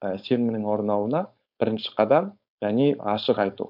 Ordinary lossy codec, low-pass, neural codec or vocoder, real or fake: none; 5.4 kHz; codec, 16 kHz, 4.8 kbps, FACodec; fake